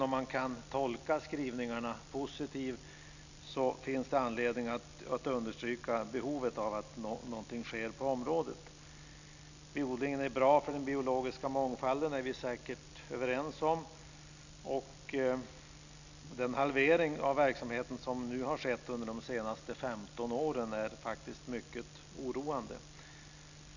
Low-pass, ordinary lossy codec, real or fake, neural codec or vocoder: 7.2 kHz; none; real; none